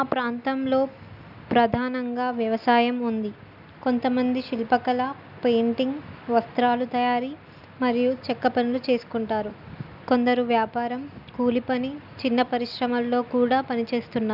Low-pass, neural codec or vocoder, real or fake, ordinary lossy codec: 5.4 kHz; none; real; none